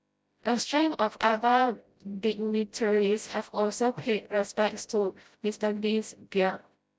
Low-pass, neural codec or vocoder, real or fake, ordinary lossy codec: none; codec, 16 kHz, 0.5 kbps, FreqCodec, smaller model; fake; none